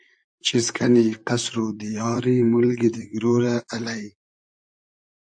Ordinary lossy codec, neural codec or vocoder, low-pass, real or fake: AAC, 64 kbps; vocoder, 44.1 kHz, 128 mel bands, Pupu-Vocoder; 9.9 kHz; fake